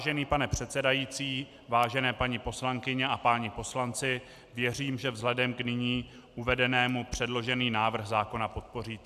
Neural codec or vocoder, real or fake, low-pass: none; real; 14.4 kHz